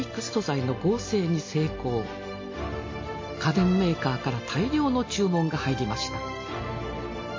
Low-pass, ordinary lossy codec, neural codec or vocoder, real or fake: 7.2 kHz; MP3, 32 kbps; none; real